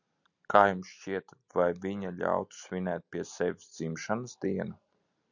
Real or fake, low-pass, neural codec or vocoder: real; 7.2 kHz; none